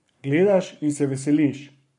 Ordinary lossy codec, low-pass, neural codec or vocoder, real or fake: MP3, 48 kbps; 10.8 kHz; codec, 44.1 kHz, 7.8 kbps, Pupu-Codec; fake